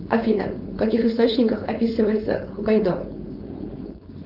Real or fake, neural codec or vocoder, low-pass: fake; codec, 16 kHz, 4.8 kbps, FACodec; 5.4 kHz